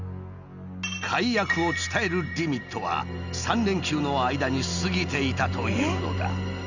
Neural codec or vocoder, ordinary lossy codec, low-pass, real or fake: none; none; 7.2 kHz; real